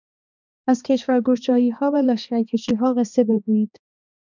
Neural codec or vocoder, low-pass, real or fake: codec, 16 kHz, 2 kbps, X-Codec, HuBERT features, trained on balanced general audio; 7.2 kHz; fake